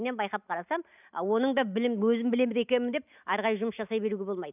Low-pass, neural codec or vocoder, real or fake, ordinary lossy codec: 3.6 kHz; none; real; none